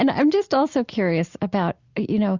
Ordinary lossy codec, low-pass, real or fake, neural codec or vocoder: Opus, 64 kbps; 7.2 kHz; real; none